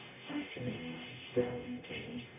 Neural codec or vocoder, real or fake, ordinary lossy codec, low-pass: codec, 44.1 kHz, 0.9 kbps, DAC; fake; MP3, 32 kbps; 3.6 kHz